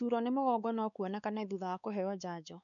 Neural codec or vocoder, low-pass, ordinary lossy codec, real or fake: codec, 16 kHz, 4 kbps, X-Codec, WavLM features, trained on Multilingual LibriSpeech; 7.2 kHz; none; fake